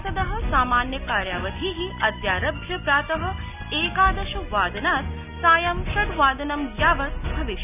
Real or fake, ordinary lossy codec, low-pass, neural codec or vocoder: real; none; 3.6 kHz; none